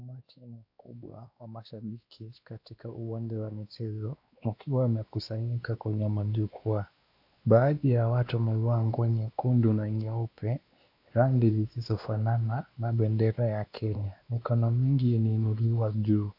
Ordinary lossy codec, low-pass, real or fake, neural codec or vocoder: AAC, 48 kbps; 5.4 kHz; fake; codec, 16 kHz, 2 kbps, X-Codec, WavLM features, trained on Multilingual LibriSpeech